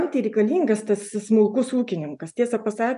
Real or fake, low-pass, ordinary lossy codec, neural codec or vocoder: real; 10.8 kHz; MP3, 96 kbps; none